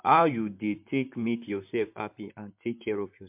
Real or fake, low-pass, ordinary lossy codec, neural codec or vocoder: fake; 3.6 kHz; MP3, 32 kbps; vocoder, 44.1 kHz, 128 mel bands, Pupu-Vocoder